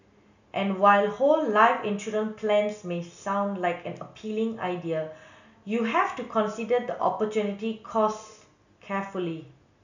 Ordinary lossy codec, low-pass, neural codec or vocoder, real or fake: none; 7.2 kHz; none; real